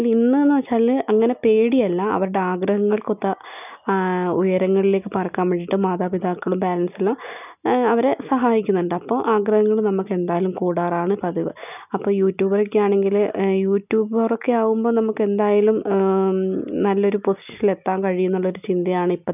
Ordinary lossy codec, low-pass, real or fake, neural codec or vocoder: none; 3.6 kHz; real; none